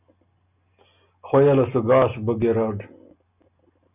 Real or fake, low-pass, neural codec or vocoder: real; 3.6 kHz; none